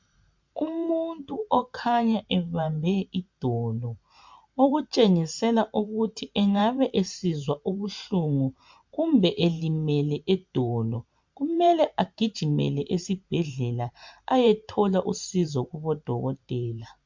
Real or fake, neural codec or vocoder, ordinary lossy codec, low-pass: fake; vocoder, 44.1 kHz, 128 mel bands every 512 samples, BigVGAN v2; MP3, 64 kbps; 7.2 kHz